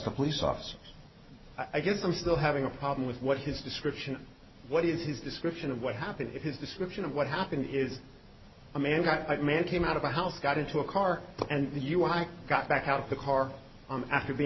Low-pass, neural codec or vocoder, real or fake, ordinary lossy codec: 7.2 kHz; none; real; MP3, 24 kbps